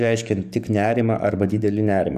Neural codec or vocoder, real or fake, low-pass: codec, 44.1 kHz, 7.8 kbps, DAC; fake; 14.4 kHz